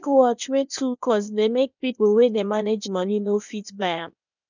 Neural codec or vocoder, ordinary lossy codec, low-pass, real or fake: codec, 16 kHz, 0.8 kbps, ZipCodec; none; 7.2 kHz; fake